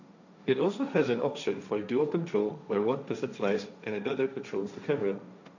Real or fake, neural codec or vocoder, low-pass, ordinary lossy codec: fake; codec, 16 kHz, 1.1 kbps, Voila-Tokenizer; 7.2 kHz; none